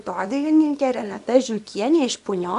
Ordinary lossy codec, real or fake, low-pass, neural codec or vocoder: AAC, 96 kbps; fake; 10.8 kHz; codec, 24 kHz, 0.9 kbps, WavTokenizer, small release